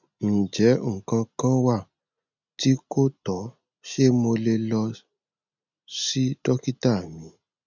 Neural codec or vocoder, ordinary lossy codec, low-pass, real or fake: none; none; 7.2 kHz; real